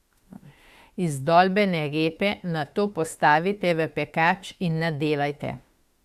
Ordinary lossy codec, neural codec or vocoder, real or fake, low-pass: Opus, 64 kbps; autoencoder, 48 kHz, 32 numbers a frame, DAC-VAE, trained on Japanese speech; fake; 14.4 kHz